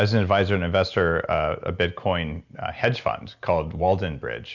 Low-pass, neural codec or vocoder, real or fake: 7.2 kHz; none; real